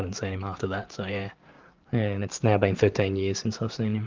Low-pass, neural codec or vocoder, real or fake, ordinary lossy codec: 7.2 kHz; vocoder, 44.1 kHz, 128 mel bands every 512 samples, BigVGAN v2; fake; Opus, 32 kbps